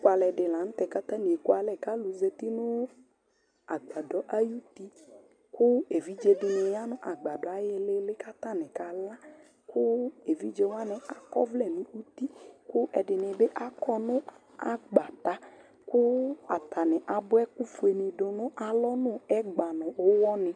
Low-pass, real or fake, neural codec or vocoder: 9.9 kHz; real; none